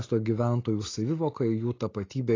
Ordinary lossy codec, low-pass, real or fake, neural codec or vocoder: AAC, 32 kbps; 7.2 kHz; real; none